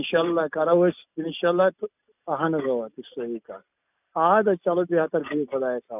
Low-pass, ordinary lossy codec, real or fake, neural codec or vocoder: 3.6 kHz; none; real; none